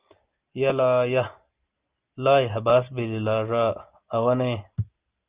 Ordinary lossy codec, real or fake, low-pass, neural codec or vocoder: Opus, 24 kbps; real; 3.6 kHz; none